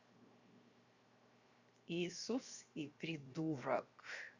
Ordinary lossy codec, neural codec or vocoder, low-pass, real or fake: Opus, 64 kbps; codec, 16 kHz, 0.7 kbps, FocalCodec; 7.2 kHz; fake